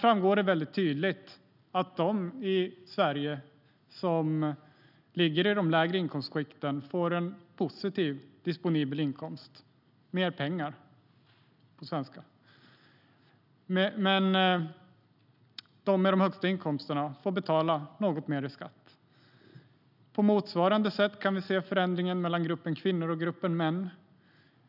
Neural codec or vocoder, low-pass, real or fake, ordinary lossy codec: none; 5.4 kHz; real; none